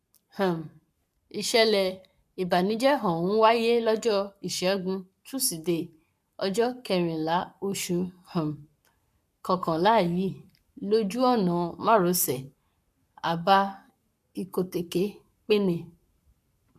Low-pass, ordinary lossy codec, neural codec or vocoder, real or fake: 14.4 kHz; MP3, 96 kbps; codec, 44.1 kHz, 7.8 kbps, Pupu-Codec; fake